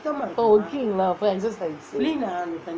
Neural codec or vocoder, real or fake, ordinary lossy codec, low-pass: none; real; none; none